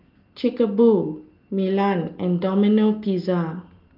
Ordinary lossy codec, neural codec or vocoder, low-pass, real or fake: Opus, 32 kbps; none; 5.4 kHz; real